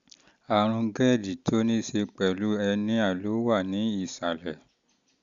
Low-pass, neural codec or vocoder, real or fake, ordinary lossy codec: 7.2 kHz; none; real; Opus, 64 kbps